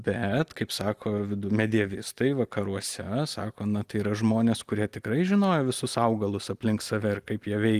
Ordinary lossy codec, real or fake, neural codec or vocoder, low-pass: Opus, 24 kbps; real; none; 14.4 kHz